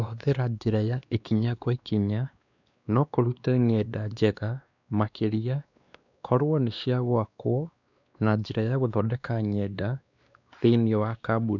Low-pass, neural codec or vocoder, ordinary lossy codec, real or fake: 7.2 kHz; codec, 16 kHz, 2 kbps, X-Codec, WavLM features, trained on Multilingual LibriSpeech; none; fake